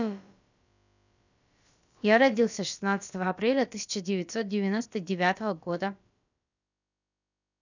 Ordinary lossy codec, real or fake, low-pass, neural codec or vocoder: none; fake; 7.2 kHz; codec, 16 kHz, about 1 kbps, DyCAST, with the encoder's durations